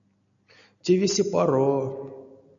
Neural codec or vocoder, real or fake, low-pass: none; real; 7.2 kHz